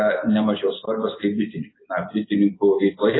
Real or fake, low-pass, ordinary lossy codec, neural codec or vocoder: real; 7.2 kHz; AAC, 16 kbps; none